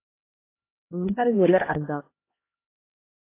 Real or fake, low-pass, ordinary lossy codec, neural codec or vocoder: fake; 3.6 kHz; AAC, 24 kbps; codec, 16 kHz, 1 kbps, X-Codec, HuBERT features, trained on LibriSpeech